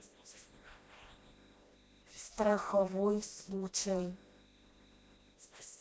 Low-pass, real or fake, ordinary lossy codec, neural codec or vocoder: none; fake; none; codec, 16 kHz, 1 kbps, FreqCodec, smaller model